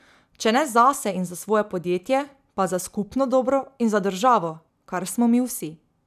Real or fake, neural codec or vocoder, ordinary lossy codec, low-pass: real; none; none; 14.4 kHz